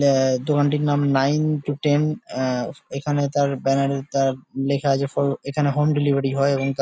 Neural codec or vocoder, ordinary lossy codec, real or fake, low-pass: none; none; real; none